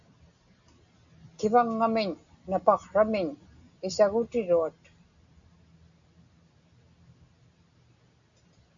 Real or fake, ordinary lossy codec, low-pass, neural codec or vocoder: real; AAC, 64 kbps; 7.2 kHz; none